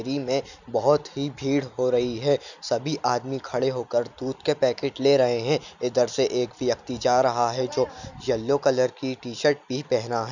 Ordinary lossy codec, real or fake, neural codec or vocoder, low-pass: none; real; none; 7.2 kHz